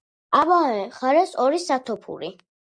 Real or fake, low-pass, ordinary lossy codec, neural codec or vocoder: real; 9.9 kHz; Opus, 64 kbps; none